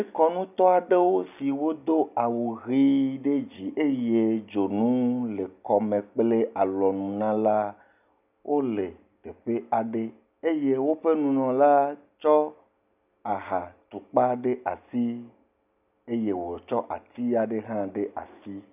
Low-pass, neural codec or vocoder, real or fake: 3.6 kHz; none; real